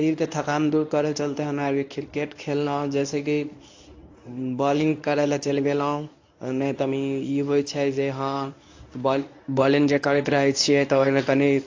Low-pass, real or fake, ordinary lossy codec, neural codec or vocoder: 7.2 kHz; fake; AAC, 48 kbps; codec, 24 kHz, 0.9 kbps, WavTokenizer, medium speech release version 1